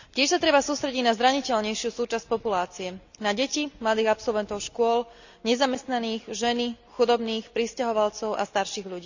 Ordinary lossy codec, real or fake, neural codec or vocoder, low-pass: none; real; none; 7.2 kHz